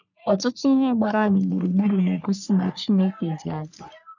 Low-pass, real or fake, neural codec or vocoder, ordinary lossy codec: 7.2 kHz; fake; codec, 44.1 kHz, 3.4 kbps, Pupu-Codec; none